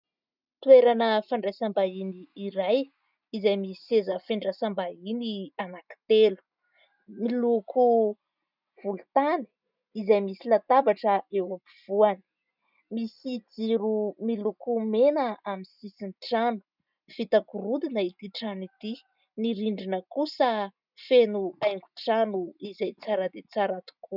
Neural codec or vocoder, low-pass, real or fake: none; 5.4 kHz; real